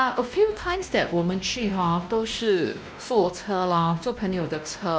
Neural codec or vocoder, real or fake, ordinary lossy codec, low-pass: codec, 16 kHz, 1 kbps, X-Codec, WavLM features, trained on Multilingual LibriSpeech; fake; none; none